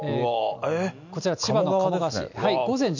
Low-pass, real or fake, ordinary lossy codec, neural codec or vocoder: 7.2 kHz; real; none; none